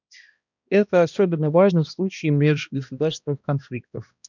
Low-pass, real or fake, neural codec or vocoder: 7.2 kHz; fake; codec, 16 kHz, 1 kbps, X-Codec, HuBERT features, trained on balanced general audio